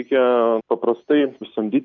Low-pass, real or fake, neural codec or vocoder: 7.2 kHz; real; none